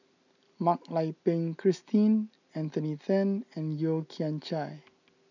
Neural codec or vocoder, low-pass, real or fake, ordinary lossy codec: none; 7.2 kHz; real; none